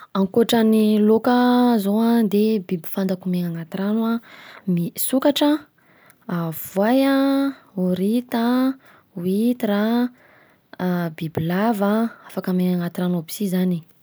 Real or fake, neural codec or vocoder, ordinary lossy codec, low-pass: real; none; none; none